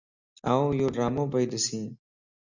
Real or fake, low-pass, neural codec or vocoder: real; 7.2 kHz; none